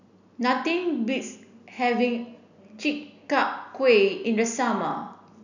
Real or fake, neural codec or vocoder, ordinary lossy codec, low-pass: real; none; none; 7.2 kHz